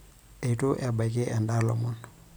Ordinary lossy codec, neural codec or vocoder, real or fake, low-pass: none; vocoder, 44.1 kHz, 128 mel bands every 256 samples, BigVGAN v2; fake; none